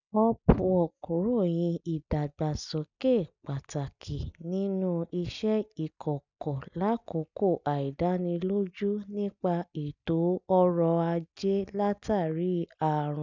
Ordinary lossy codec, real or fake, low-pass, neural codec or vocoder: none; real; 7.2 kHz; none